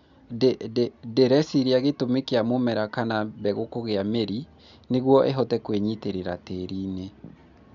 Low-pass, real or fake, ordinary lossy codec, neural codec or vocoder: 7.2 kHz; real; none; none